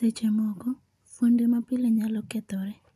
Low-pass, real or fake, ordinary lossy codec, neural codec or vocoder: 14.4 kHz; real; none; none